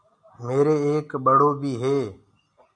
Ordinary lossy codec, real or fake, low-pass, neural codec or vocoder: MP3, 96 kbps; real; 9.9 kHz; none